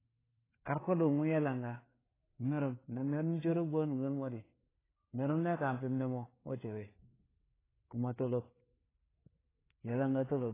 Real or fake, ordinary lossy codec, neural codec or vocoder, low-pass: fake; AAC, 16 kbps; codec, 16 kHz, 4 kbps, FreqCodec, larger model; 3.6 kHz